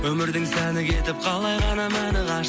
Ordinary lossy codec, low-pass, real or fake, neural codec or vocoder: none; none; real; none